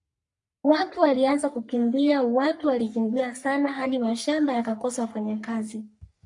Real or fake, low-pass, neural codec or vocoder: fake; 10.8 kHz; codec, 44.1 kHz, 3.4 kbps, Pupu-Codec